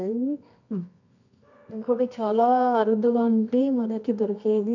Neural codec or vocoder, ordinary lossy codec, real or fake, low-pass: codec, 24 kHz, 0.9 kbps, WavTokenizer, medium music audio release; none; fake; 7.2 kHz